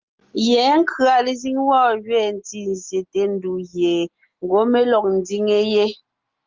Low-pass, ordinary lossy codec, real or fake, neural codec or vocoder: 7.2 kHz; Opus, 24 kbps; real; none